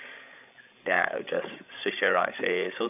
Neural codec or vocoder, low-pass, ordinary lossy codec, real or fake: codec, 16 kHz, 16 kbps, FreqCodec, larger model; 3.6 kHz; none; fake